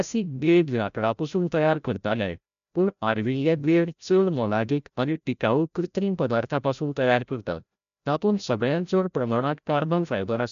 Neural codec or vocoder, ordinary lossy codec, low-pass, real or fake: codec, 16 kHz, 0.5 kbps, FreqCodec, larger model; MP3, 96 kbps; 7.2 kHz; fake